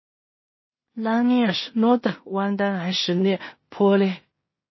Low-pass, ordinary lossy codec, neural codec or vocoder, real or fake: 7.2 kHz; MP3, 24 kbps; codec, 16 kHz in and 24 kHz out, 0.4 kbps, LongCat-Audio-Codec, two codebook decoder; fake